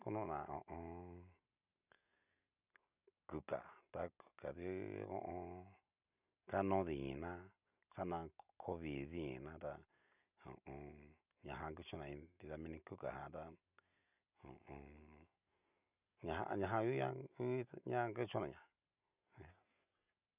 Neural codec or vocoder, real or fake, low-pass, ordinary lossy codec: none; real; 3.6 kHz; none